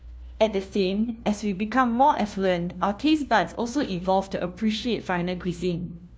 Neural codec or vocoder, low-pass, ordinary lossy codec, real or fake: codec, 16 kHz, 1 kbps, FunCodec, trained on LibriTTS, 50 frames a second; none; none; fake